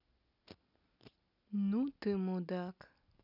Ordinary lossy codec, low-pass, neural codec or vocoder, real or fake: none; 5.4 kHz; none; real